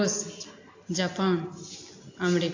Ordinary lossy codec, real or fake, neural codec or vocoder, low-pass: AAC, 48 kbps; real; none; 7.2 kHz